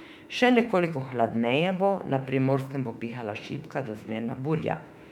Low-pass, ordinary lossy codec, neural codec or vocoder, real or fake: 19.8 kHz; none; autoencoder, 48 kHz, 32 numbers a frame, DAC-VAE, trained on Japanese speech; fake